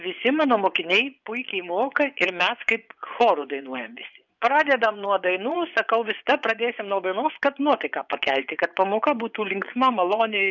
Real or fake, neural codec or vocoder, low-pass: fake; vocoder, 24 kHz, 100 mel bands, Vocos; 7.2 kHz